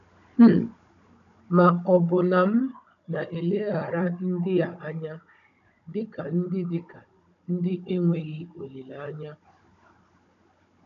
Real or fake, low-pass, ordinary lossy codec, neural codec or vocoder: fake; 7.2 kHz; none; codec, 16 kHz, 16 kbps, FunCodec, trained on Chinese and English, 50 frames a second